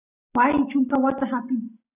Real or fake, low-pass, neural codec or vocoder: real; 3.6 kHz; none